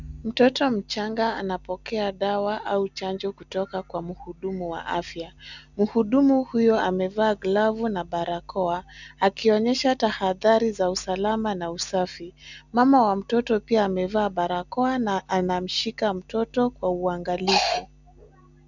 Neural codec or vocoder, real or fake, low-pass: none; real; 7.2 kHz